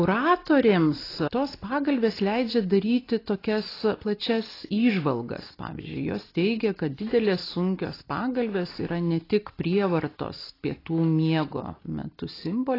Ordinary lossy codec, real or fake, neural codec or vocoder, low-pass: AAC, 24 kbps; real; none; 5.4 kHz